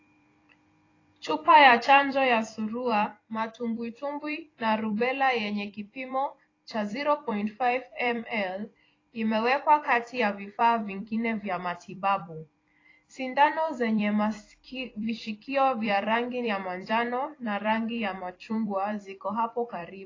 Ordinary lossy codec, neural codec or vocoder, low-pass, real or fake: AAC, 32 kbps; vocoder, 44.1 kHz, 128 mel bands every 256 samples, BigVGAN v2; 7.2 kHz; fake